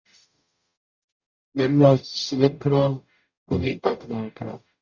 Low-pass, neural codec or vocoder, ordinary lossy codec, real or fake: 7.2 kHz; codec, 44.1 kHz, 0.9 kbps, DAC; Opus, 64 kbps; fake